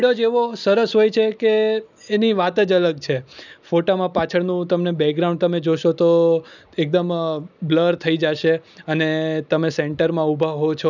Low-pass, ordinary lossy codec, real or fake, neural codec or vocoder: 7.2 kHz; none; real; none